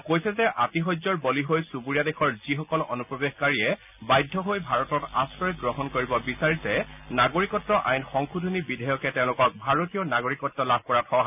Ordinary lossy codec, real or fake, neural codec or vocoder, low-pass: none; real; none; 3.6 kHz